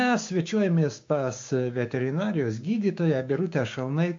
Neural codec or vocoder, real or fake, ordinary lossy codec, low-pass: codec, 16 kHz, 6 kbps, DAC; fake; MP3, 48 kbps; 7.2 kHz